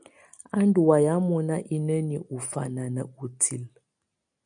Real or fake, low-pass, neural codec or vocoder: real; 9.9 kHz; none